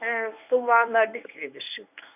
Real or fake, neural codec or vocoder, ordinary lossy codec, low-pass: fake; codec, 24 kHz, 0.9 kbps, WavTokenizer, medium speech release version 1; none; 3.6 kHz